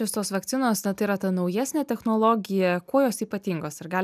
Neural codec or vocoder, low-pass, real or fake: none; 14.4 kHz; real